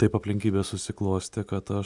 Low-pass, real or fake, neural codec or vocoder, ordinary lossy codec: 10.8 kHz; real; none; MP3, 96 kbps